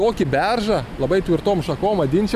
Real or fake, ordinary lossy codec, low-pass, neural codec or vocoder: real; AAC, 96 kbps; 14.4 kHz; none